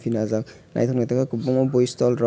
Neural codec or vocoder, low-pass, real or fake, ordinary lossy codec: none; none; real; none